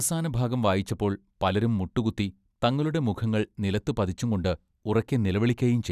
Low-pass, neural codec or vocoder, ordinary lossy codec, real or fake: 14.4 kHz; none; none; real